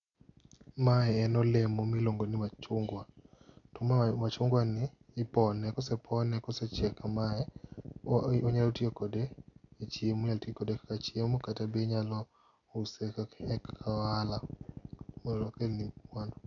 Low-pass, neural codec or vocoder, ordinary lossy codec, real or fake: 7.2 kHz; none; none; real